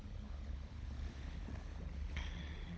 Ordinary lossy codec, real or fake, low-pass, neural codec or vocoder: none; fake; none; codec, 16 kHz, 16 kbps, FunCodec, trained on LibriTTS, 50 frames a second